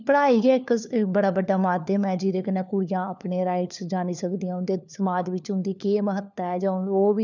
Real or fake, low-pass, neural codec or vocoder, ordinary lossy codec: fake; 7.2 kHz; codec, 16 kHz, 4 kbps, FunCodec, trained on LibriTTS, 50 frames a second; none